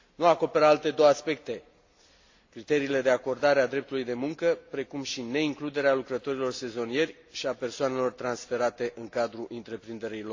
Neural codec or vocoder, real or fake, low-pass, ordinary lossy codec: none; real; 7.2 kHz; AAC, 48 kbps